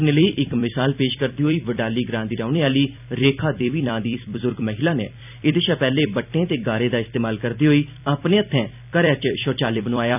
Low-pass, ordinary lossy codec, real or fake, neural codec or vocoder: 3.6 kHz; none; fake; vocoder, 44.1 kHz, 128 mel bands every 256 samples, BigVGAN v2